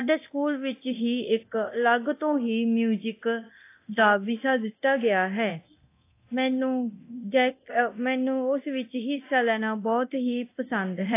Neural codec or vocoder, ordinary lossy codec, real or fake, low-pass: codec, 24 kHz, 0.9 kbps, DualCodec; AAC, 24 kbps; fake; 3.6 kHz